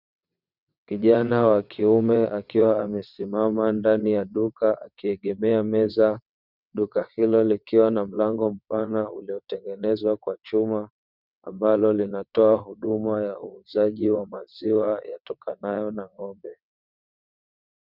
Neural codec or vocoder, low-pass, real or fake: vocoder, 22.05 kHz, 80 mel bands, WaveNeXt; 5.4 kHz; fake